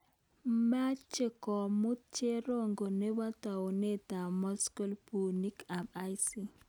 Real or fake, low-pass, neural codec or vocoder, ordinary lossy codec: real; none; none; none